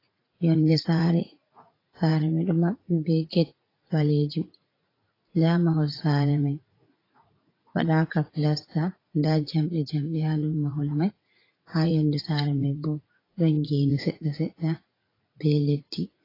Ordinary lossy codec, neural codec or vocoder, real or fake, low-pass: AAC, 24 kbps; vocoder, 44.1 kHz, 80 mel bands, Vocos; fake; 5.4 kHz